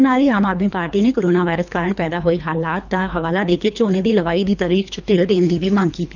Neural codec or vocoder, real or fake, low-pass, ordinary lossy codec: codec, 24 kHz, 3 kbps, HILCodec; fake; 7.2 kHz; none